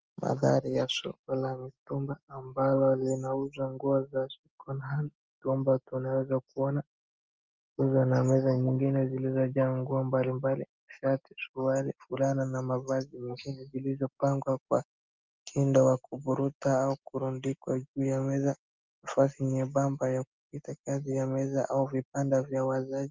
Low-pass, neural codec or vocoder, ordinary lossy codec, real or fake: 7.2 kHz; none; Opus, 24 kbps; real